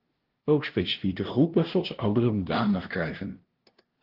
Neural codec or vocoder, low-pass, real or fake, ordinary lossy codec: codec, 16 kHz, 0.5 kbps, FunCodec, trained on LibriTTS, 25 frames a second; 5.4 kHz; fake; Opus, 16 kbps